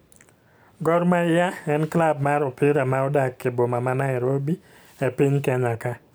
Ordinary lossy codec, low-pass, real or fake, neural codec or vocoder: none; none; real; none